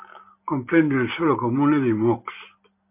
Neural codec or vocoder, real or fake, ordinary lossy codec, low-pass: none; real; MP3, 32 kbps; 3.6 kHz